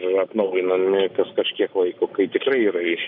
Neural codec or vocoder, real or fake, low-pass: none; real; 5.4 kHz